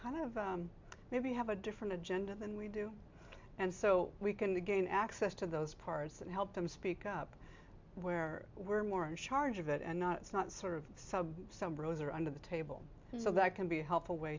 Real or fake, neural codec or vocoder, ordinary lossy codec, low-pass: real; none; MP3, 64 kbps; 7.2 kHz